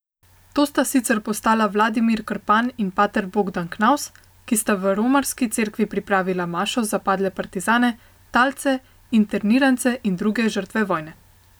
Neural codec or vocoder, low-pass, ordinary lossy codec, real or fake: none; none; none; real